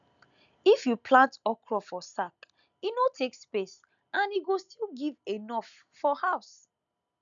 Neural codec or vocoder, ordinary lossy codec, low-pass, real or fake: none; none; 7.2 kHz; real